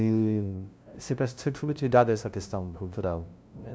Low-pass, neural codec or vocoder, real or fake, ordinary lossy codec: none; codec, 16 kHz, 0.5 kbps, FunCodec, trained on LibriTTS, 25 frames a second; fake; none